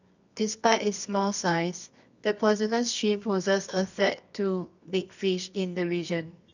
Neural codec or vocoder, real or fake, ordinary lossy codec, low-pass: codec, 24 kHz, 0.9 kbps, WavTokenizer, medium music audio release; fake; none; 7.2 kHz